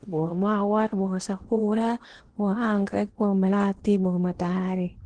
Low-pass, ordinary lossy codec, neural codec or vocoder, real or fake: 9.9 kHz; Opus, 16 kbps; codec, 16 kHz in and 24 kHz out, 0.8 kbps, FocalCodec, streaming, 65536 codes; fake